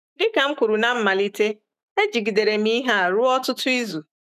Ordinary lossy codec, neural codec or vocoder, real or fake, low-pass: none; autoencoder, 48 kHz, 128 numbers a frame, DAC-VAE, trained on Japanese speech; fake; 14.4 kHz